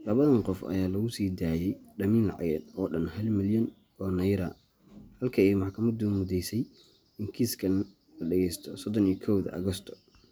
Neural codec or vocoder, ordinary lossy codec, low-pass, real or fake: none; none; none; real